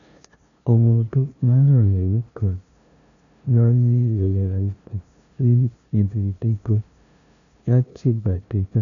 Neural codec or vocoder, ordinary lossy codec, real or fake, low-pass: codec, 16 kHz, 0.5 kbps, FunCodec, trained on LibriTTS, 25 frames a second; none; fake; 7.2 kHz